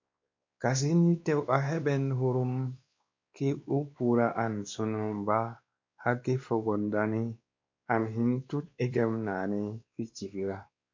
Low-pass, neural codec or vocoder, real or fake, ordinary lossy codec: 7.2 kHz; codec, 16 kHz, 2 kbps, X-Codec, WavLM features, trained on Multilingual LibriSpeech; fake; MP3, 48 kbps